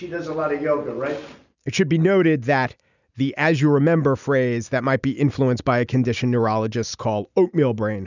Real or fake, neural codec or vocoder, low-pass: real; none; 7.2 kHz